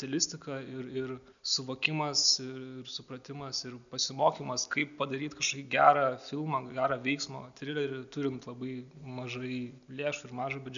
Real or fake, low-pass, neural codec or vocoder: real; 7.2 kHz; none